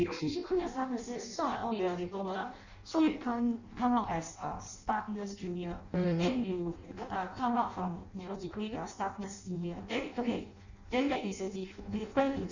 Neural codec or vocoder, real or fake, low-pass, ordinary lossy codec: codec, 16 kHz in and 24 kHz out, 0.6 kbps, FireRedTTS-2 codec; fake; 7.2 kHz; none